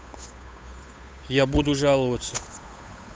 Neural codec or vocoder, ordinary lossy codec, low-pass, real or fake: codec, 16 kHz, 8 kbps, FunCodec, trained on Chinese and English, 25 frames a second; none; none; fake